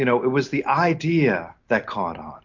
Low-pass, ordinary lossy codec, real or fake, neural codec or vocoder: 7.2 kHz; MP3, 48 kbps; real; none